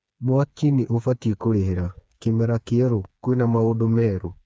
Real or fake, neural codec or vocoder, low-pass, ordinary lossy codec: fake; codec, 16 kHz, 4 kbps, FreqCodec, smaller model; none; none